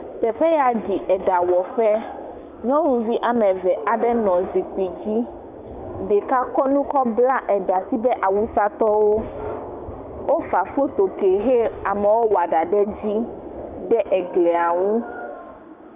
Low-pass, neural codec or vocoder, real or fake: 3.6 kHz; codec, 16 kHz, 6 kbps, DAC; fake